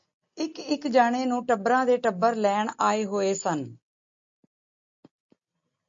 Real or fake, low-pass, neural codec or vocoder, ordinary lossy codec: real; 7.2 kHz; none; MP3, 48 kbps